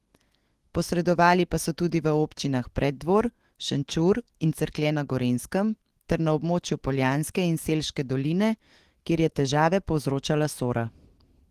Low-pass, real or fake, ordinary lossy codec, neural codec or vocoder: 14.4 kHz; fake; Opus, 16 kbps; autoencoder, 48 kHz, 128 numbers a frame, DAC-VAE, trained on Japanese speech